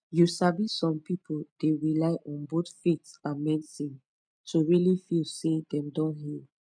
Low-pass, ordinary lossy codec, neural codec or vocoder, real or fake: 9.9 kHz; none; none; real